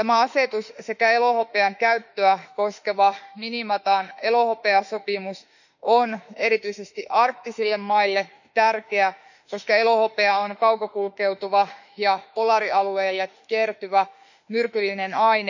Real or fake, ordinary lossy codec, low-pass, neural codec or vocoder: fake; none; 7.2 kHz; autoencoder, 48 kHz, 32 numbers a frame, DAC-VAE, trained on Japanese speech